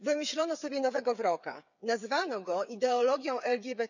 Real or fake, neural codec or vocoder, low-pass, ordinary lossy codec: fake; codec, 16 kHz, 8 kbps, FreqCodec, smaller model; 7.2 kHz; none